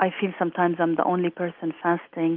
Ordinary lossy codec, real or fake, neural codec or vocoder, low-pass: Opus, 24 kbps; real; none; 5.4 kHz